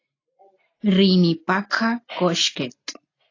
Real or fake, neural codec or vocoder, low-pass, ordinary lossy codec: real; none; 7.2 kHz; AAC, 32 kbps